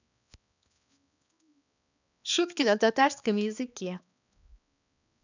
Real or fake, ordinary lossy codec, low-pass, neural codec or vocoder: fake; none; 7.2 kHz; codec, 16 kHz, 2 kbps, X-Codec, HuBERT features, trained on balanced general audio